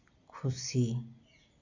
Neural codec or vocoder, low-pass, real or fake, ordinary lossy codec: none; 7.2 kHz; real; none